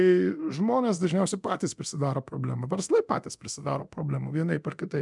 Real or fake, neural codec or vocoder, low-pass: fake; codec, 24 kHz, 0.9 kbps, DualCodec; 10.8 kHz